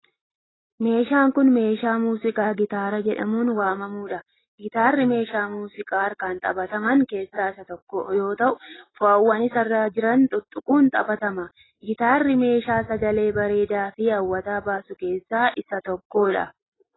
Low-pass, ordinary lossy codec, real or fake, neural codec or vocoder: 7.2 kHz; AAC, 16 kbps; real; none